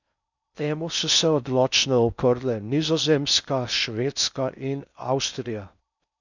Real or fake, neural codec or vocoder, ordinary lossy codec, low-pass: fake; codec, 16 kHz in and 24 kHz out, 0.6 kbps, FocalCodec, streaming, 4096 codes; none; 7.2 kHz